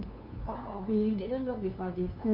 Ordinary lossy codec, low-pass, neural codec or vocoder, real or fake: none; 5.4 kHz; codec, 16 kHz, 2 kbps, FunCodec, trained on LibriTTS, 25 frames a second; fake